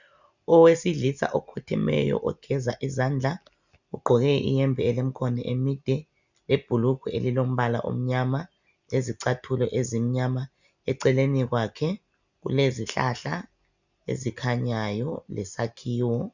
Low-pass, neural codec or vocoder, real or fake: 7.2 kHz; none; real